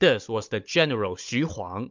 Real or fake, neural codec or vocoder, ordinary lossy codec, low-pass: fake; codec, 16 kHz, 16 kbps, FunCodec, trained on Chinese and English, 50 frames a second; MP3, 64 kbps; 7.2 kHz